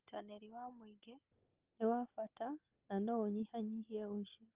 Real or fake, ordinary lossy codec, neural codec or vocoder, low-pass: real; Opus, 24 kbps; none; 3.6 kHz